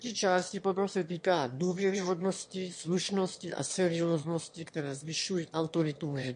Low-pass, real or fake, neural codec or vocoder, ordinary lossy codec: 9.9 kHz; fake; autoencoder, 22.05 kHz, a latent of 192 numbers a frame, VITS, trained on one speaker; MP3, 48 kbps